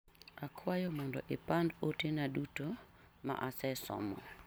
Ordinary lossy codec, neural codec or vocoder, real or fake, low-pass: none; none; real; none